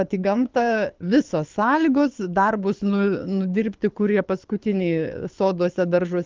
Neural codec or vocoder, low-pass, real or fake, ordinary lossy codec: codec, 24 kHz, 6 kbps, HILCodec; 7.2 kHz; fake; Opus, 32 kbps